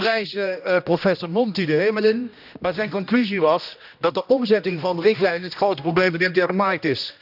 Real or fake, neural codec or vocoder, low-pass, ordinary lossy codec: fake; codec, 16 kHz, 1 kbps, X-Codec, HuBERT features, trained on general audio; 5.4 kHz; none